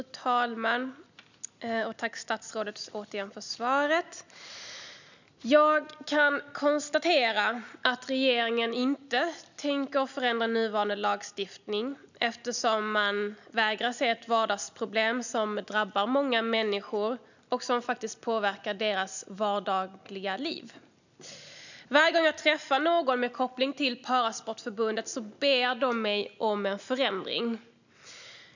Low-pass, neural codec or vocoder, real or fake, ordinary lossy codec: 7.2 kHz; none; real; none